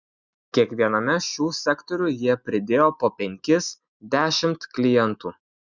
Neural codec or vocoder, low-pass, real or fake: none; 7.2 kHz; real